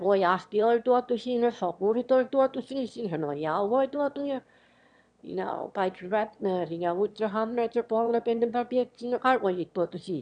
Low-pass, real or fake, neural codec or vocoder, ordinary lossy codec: 9.9 kHz; fake; autoencoder, 22.05 kHz, a latent of 192 numbers a frame, VITS, trained on one speaker; none